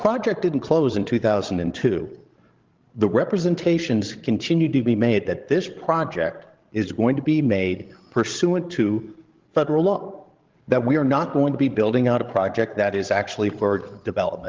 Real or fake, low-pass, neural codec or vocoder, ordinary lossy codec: fake; 7.2 kHz; codec, 16 kHz, 16 kbps, FreqCodec, larger model; Opus, 16 kbps